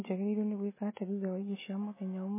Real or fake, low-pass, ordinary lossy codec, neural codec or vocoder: real; 3.6 kHz; MP3, 16 kbps; none